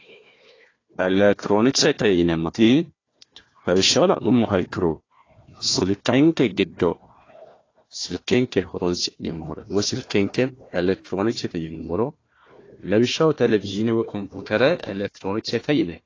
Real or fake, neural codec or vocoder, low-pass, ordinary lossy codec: fake; codec, 16 kHz, 1 kbps, FunCodec, trained on Chinese and English, 50 frames a second; 7.2 kHz; AAC, 32 kbps